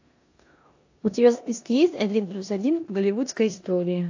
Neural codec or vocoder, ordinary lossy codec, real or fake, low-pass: codec, 16 kHz in and 24 kHz out, 0.9 kbps, LongCat-Audio-Codec, four codebook decoder; AAC, 48 kbps; fake; 7.2 kHz